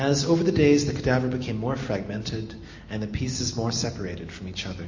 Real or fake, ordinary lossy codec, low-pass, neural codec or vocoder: real; MP3, 32 kbps; 7.2 kHz; none